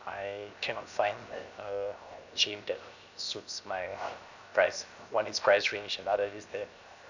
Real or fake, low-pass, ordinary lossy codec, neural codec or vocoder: fake; 7.2 kHz; none; codec, 16 kHz, 0.7 kbps, FocalCodec